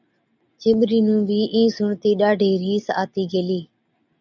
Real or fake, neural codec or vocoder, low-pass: real; none; 7.2 kHz